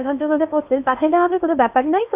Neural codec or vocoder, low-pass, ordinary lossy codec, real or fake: codec, 16 kHz in and 24 kHz out, 0.8 kbps, FocalCodec, streaming, 65536 codes; 3.6 kHz; none; fake